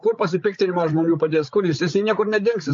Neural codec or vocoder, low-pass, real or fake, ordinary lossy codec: codec, 16 kHz, 8 kbps, FreqCodec, larger model; 7.2 kHz; fake; MP3, 48 kbps